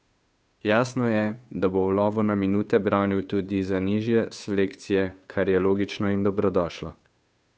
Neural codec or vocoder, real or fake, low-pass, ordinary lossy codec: codec, 16 kHz, 2 kbps, FunCodec, trained on Chinese and English, 25 frames a second; fake; none; none